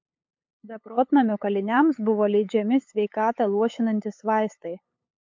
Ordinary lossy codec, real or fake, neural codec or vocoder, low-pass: MP3, 48 kbps; fake; codec, 16 kHz, 8 kbps, FunCodec, trained on LibriTTS, 25 frames a second; 7.2 kHz